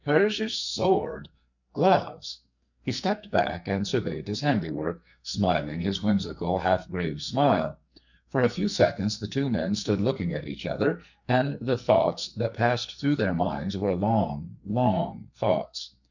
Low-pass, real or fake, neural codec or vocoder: 7.2 kHz; fake; codec, 44.1 kHz, 2.6 kbps, SNAC